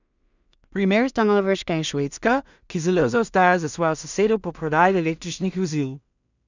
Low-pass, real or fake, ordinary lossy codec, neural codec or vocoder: 7.2 kHz; fake; none; codec, 16 kHz in and 24 kHz out, 0.4 kbps, LongCat-Audio-Codec, two codebook decoder